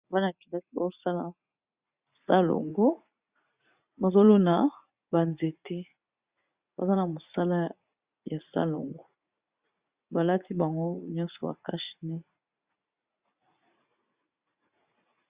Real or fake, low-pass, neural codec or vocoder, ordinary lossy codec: fake; 3.6 kHz; vocoder, 44.1 kHz, 80 mel bands, Vocos; Opus, 64 kbps